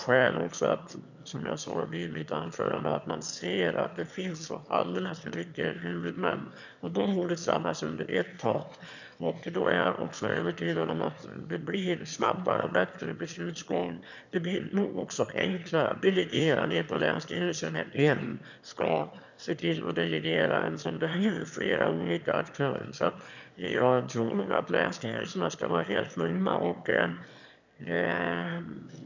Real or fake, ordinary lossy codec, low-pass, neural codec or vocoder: fake; none; 7.2 kHz; autoencoder, 22.05 kHz, a latent of 192 numbers a frame, VITS, trained on one speaker